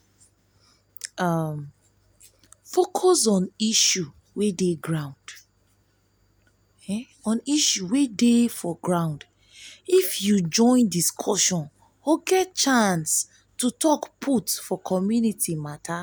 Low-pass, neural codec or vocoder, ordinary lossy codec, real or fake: none; none; none; real